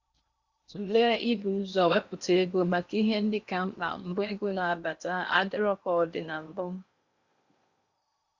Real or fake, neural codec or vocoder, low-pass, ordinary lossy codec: fake; codec, 16 kHz in and 24 kHz out, 0.8 kbps, FocalCodec, streaming, 65536 codes; 7.2 kHz; Opus, 64 kbps